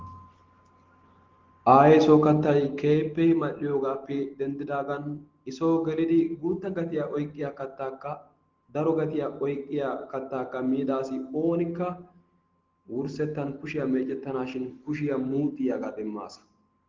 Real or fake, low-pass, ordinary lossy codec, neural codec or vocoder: real; 7.2 kHz; Opus, 16 kbps; none